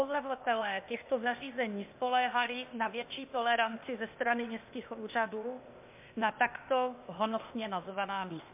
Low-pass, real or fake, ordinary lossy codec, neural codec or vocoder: 3.6 kHz; fake; MP3, 32 kbps; codec, 16 kHz, 0.8 kbps, ZipCodec